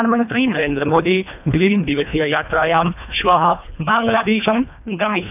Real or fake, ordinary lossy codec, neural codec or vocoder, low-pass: fake; none; codec, 24 kHz, 1.5 kbps, HILCodec; 3.6 kHz